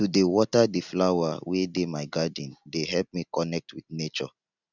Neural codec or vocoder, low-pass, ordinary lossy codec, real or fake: none; 7.2 kHz; none; real